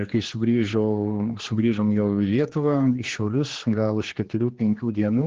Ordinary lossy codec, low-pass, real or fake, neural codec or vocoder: Opus, 16 kbps; 7.2 kHz; fake; codec, 16 kHz, 2 kbps, X-Codec, HuBERT features, trained on general audio